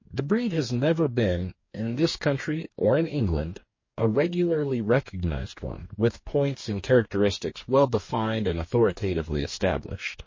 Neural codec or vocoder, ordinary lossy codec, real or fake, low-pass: codec, 44.1 kHz, 2.6 kbps, DAC; MP3, 32 kbps; fake; 7.2 kHz